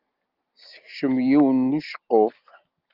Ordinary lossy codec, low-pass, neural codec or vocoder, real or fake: Opus, 32 kbps; 5.4 kHz; none; real